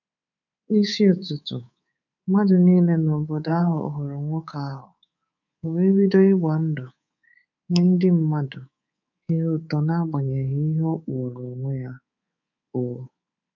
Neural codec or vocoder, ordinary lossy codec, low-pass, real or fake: codec, 24 kHz, 3.1 kbps, DualCodec; none; 7.2 kHz; fake